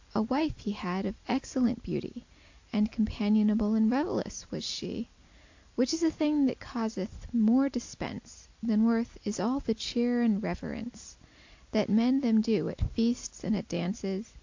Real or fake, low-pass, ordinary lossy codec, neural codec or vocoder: real; 7.2 kHz; AAC, 48 kbps; none